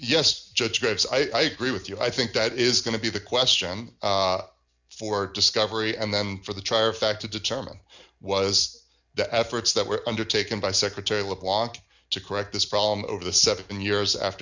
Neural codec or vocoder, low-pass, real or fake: none; 7.2 kHz; real